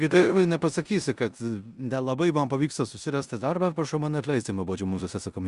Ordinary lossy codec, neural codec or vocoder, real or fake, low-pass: Opus, 64 kbps; codec, 16 kHz in and 24 kHz out, 0.9 kbps, LongCat-Audio-Codec, four codebook decoder; fake; 10.8 kHz